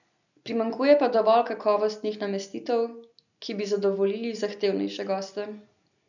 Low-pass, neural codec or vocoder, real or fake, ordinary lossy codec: 7.2 kHz; none; real; none